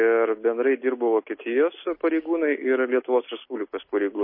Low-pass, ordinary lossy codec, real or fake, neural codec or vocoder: 5.4 kHz; MP3, 24 kbps; real; none